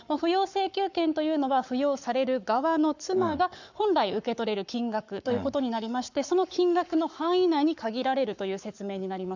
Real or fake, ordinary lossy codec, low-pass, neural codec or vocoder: fake; none; 7.2 kHz; codec, 44.1 kHz, 7.8 kbps, Pupu-Codec